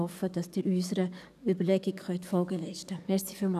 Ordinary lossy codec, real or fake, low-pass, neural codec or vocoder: none; fake; 14.4 kHz; codec, 44.1 kHz, 7.8 kbps, DAC